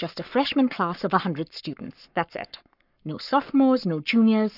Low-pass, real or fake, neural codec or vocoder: 5.4 kHz; fake; codec, 44.1 kHz, 7.8 kbps, Pupu-Codec